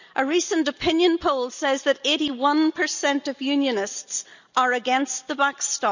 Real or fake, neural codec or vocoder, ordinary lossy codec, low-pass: real; none; none; 7.2 kHz